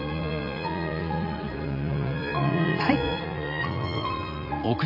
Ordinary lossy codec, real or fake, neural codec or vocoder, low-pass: none; fake; vocoder, 44.1 kHz, 80 mel bands, Vocos; 5.4 kHz